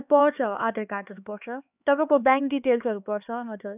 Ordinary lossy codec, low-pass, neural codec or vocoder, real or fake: none; 3.6 kHz; codec, 16 kHz, 2 kbps, X-Codec, HuBERT features, trained on LibriSpeech; fake